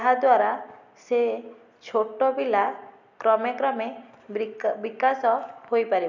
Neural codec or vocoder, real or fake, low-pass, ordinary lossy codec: none; real; 7.2 kHz; none